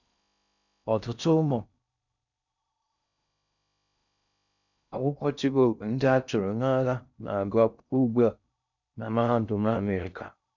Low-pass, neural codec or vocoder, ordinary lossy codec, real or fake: 7.2 kHz; codec, 16 kHz in and 24 kHz out, 0.6 kbps, FocalCodec, streaming, 4096 codes; none; fake